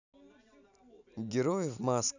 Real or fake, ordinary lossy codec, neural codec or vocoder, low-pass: real; none; none; 7.2 kHz